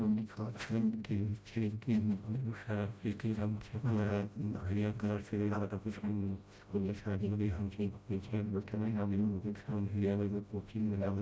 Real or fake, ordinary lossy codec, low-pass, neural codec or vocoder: fake; none; none; codec, 16 kHz, 0.5 kbps, FreqCodec, smaller model